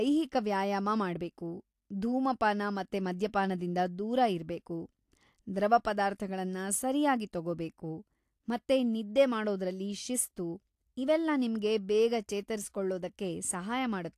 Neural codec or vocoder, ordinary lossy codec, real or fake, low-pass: none; AAC, 64 kbps; real; 14.4 kHz